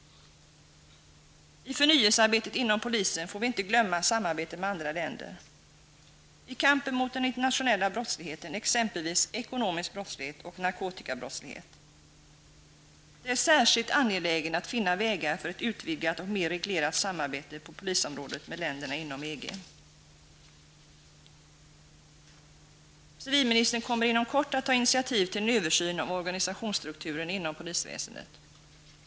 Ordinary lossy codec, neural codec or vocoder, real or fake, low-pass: none; none; real; none